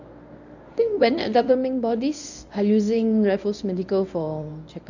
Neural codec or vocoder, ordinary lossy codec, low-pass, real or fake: codec, 24 kHz, 0.9 kbps, WavTokenizer, medium speech release version 1; none; 7.2 kHz; fake